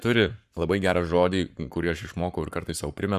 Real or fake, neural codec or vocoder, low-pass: fake; codec, 44.1 kHz, 7.8 kbps, Pupu-Codec; 14.4 kHz